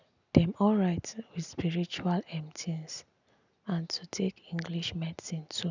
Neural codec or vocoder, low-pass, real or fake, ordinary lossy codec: none; 7.2 kHz; real; none